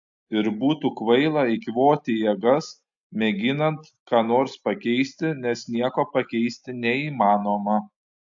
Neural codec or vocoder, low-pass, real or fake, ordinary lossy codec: none; 7.2 kHz; real; AAC, 64 kbps